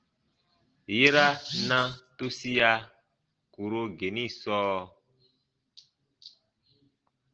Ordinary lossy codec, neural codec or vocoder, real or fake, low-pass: Opus, 16 kbps; none; real; 7.2 kHz